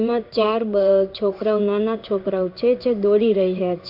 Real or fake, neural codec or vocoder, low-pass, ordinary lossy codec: fake; codec, 16 kHz in and 24 kHz out, 1 kbps, XY-Tokenizer; 5.4 kHz; none